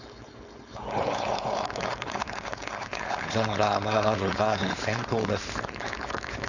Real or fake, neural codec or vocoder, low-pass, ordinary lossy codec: fake; codec, 16 kHz, 4.8 kbps, FACodec; 7.2 kHz; none